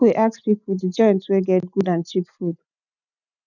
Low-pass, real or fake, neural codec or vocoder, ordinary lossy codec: 7.2 kHz; real; none; none